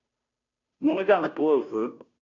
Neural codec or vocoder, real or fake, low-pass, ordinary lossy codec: codec, 16 kHz, 0.5 kbps, FunCodec, trained on Chinese and English, 25 frames a second; fake; 7.2 kHz; MP3, 96 kbps